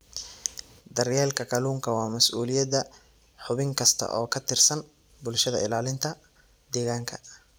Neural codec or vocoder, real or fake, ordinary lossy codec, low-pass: vocoder, 44.1 kHz, 128 mel bands every 256 samples, BigVGAN v2; fake; none; none